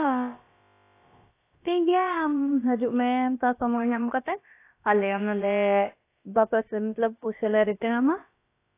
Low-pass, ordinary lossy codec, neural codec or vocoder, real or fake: 3.6 kHz; AAC, 24 kbps; codec, 16 kHz, about 1 kbps, DyCAST, with the encoder's durations; fake